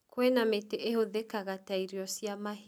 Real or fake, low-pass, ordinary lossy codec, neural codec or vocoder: real; none; none; none